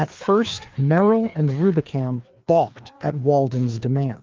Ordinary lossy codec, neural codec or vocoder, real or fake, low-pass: Opus, 24 kbps; codec, 16 kHz, 2 kbps, FreqCodec, larger model; fake; 7.2 kHz